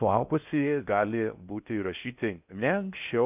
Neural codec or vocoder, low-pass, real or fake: codec, 16 kHz in and 24 kHz out, 0.6 kbps, FocalCodec, streaming, 4096 codes; 3.6 kHz; fake